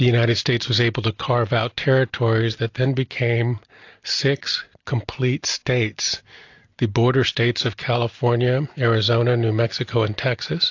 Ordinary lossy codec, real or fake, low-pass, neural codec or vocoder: AAC, 48 kbps; real; 7.2 kHz; none